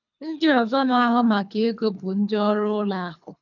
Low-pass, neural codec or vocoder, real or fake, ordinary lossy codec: 7.2 kHz; codec, 24 kHz, 3 kbps, HILCodec; fake; none